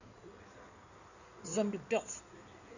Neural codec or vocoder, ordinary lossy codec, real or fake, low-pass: codec, 16 kHz in and 24 kHz out, 2.2 kbps, FireRedTTS-2 codec; none; fake; 7.2 kHz